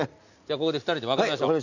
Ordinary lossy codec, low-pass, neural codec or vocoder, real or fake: none; 7.2 kHz; none; real